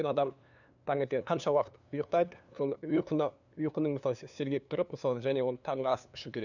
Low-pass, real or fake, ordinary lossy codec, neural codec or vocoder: 7.2 kHz; fake; none; codec, 16 kHz, 2 kbps, FunCodec, trained on LibriTTS, 25 frames a second